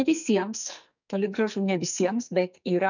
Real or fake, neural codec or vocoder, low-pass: fake; codec, 44.1 kHz, 2.6 kbps, SNAC; 7.2 kHz